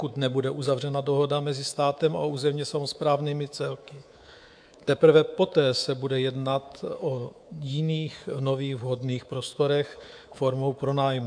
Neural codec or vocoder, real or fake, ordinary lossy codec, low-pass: codec, 24 kHz, 3.1 kbps, DualCodec; fake; AAC, 64 kbps; 9.9 kHz